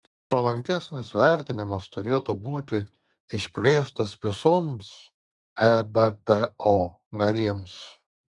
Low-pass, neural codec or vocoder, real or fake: 10.8 kHz; codec, 24 kHz, 1 kbps, SNAC; fake